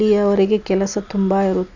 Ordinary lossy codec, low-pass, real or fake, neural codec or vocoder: none; 7.2 kHz; real; none